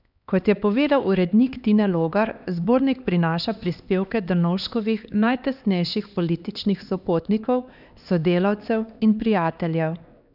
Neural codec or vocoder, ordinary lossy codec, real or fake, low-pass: codec, 16 kHz, 2 kbps, X-Codec, HuBERT features, trained on LibriSpeech; none; fake; 5.4 kHz